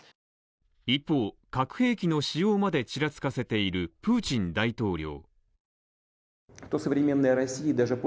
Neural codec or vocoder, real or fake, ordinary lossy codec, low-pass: none; real; none; none